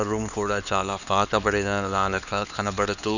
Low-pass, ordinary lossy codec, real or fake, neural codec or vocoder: 7.2 kHz; none; fake; codec, 16 kHz, 2 kbps, X-Codec, HuBERT features, trained on LibriSpeech